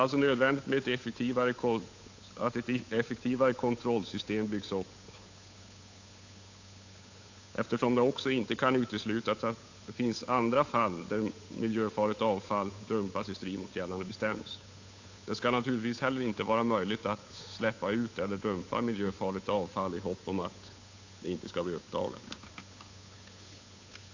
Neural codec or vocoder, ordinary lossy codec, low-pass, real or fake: codec, 16 kHz, 8 kbps, FunCodec, trained on Chinese and English, 25 frames a second; AAC, 48 kbps; 7.2 kHz; fake